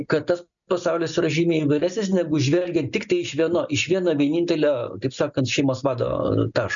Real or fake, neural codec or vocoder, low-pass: real; none; 7.2 kHz